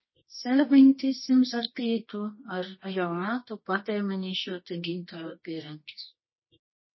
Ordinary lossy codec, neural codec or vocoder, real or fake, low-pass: MP3, 24 kbps; codec, 24 kHz, 0.9 kbps, WavTokenizer, medium music audio release; fake; 7.2 kHz